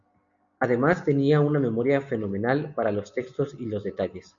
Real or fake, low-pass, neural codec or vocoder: real; 7.2 kHz; none